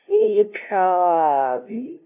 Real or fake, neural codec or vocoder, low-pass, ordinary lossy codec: fake; codec, 16 kHz, 0.5 kbps, FunCodec, trained on LibriTTS, 25 frames a second; 3.6 kHz; none